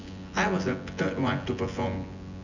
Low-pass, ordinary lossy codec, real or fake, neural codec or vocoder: 7.2 kHz; none; fake; vocoder, 24 kHz, 100 mel bands, Vocos